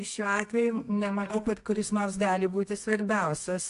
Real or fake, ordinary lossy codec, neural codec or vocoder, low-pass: fake; AAC, 64 kbps; codec, 24 kHz, 0.9 kbps, WavTokenizer, medium music audio release; 10.8 kHz